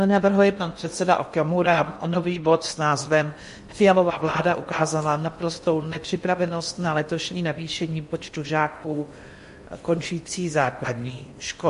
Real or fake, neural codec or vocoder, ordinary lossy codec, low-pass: fake; codec, 16 kHz in and 24 kHz out, 0.8 kbps, FocalCodec, streaming, 65536 codes; MP3, 48 kbps; 10.8 kHz